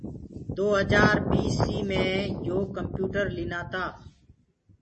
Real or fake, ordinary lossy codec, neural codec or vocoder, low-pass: real; MP3, 32 kbps; none; 10.8 kHz